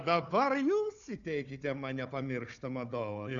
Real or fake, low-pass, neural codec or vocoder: fake; 7.2 kHz; codec, 16 kHz, 4 kbps, FunCodec, trained on Chinese and English, 50 frames a second